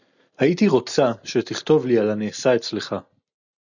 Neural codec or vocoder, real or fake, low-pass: none; real; 7.2 kHz